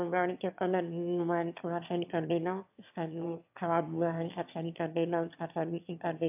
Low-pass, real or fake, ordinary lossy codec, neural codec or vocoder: 3.6 kHz; fake; AAC, 32 kbps; autoencoder, 22.05 kHz, a latent of 192 numbers a frame, VITS, trained on one speaker